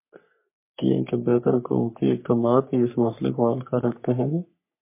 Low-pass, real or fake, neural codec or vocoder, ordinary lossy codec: 3.6 kHz; fake; codec, 44.1 kHz, 3.4 kbps, Pupu-Codec; MP3, 24 kbps